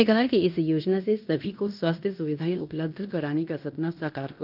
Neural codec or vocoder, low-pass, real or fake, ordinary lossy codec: codec, 16 kHz in and 24 kHz out, 0.9 kbps, LongCat-Audio-Codec, fine tuned four codebook decoder; 5.4 kHz; fake; none